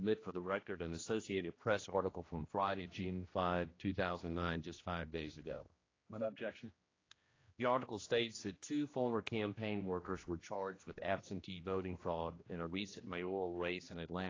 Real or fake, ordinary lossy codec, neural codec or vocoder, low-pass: fake; AAC, 32 kbps; codec, 16 kHz, 1 kbps, X-Codec, HuBERT features, trained on general audio; 7.2 kHz